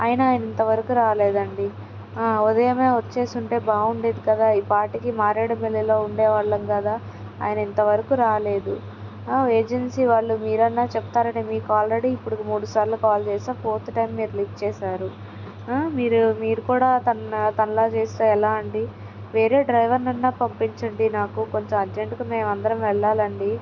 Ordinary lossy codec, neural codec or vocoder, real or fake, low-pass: none; none; real; 7.2 kHz